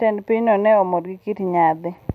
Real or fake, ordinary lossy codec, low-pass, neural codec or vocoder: real; none; 14.4 kHz; none